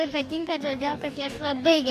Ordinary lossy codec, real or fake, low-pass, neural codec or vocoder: AAC, 96 kbps; fake; 14.4 kHz; codec, 44.1 kHz, 2.6 kbps, DAC